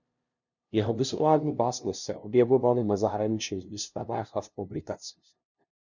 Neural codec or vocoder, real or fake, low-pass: codec, 16 kHz, 0.5 kbps, FunCodec, trained on LibriTTS, 25 frames a second; fake; 7.2 kHz